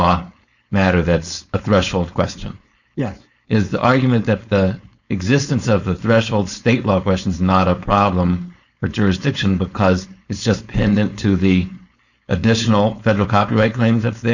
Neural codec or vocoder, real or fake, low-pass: codec, 16 kHz, 4.8 kbps, FACodec; fake; 7.2 kHz